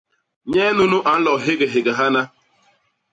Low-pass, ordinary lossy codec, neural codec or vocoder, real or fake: 9.9 kHz; AAC, 64 kbps; none; real